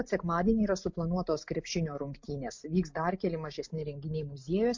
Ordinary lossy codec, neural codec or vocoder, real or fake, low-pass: MP3, 48 kbps; none; real; 7.2 kHz